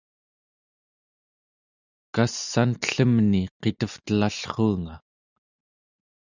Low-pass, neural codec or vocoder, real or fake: 7.2 kHz; none; real